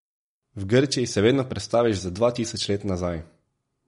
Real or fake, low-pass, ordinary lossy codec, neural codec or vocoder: real; 10.8 kHz; MP3, 48 kbps; none